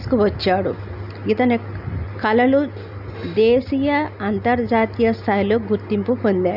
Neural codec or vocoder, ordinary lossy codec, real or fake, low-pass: none; none; real; 5.4 kHz